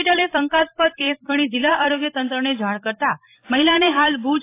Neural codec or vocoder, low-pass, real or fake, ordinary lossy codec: vocoder, 44.1 kHz, 128 mel bands every 256 samples, BigVGAN v2; 3.6 kHz; fake; AAC, 24 kbps